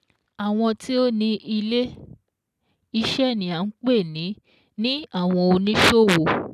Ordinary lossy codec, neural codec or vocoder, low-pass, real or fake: none; none; 14.4 kHz; real